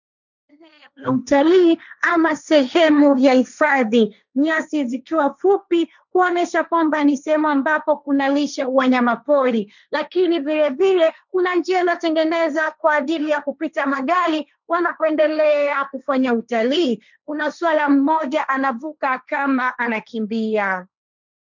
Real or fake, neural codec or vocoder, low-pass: fake; codec, 16 kHz, 1.1 kbps, Voila-Tokenizer; 7.2 kHz